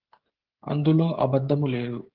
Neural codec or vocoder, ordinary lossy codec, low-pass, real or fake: codec, 16 kHz, 16 kbps, FreqCodec, smaller model; Opus, 16 kbps; 5.4 kHz; fake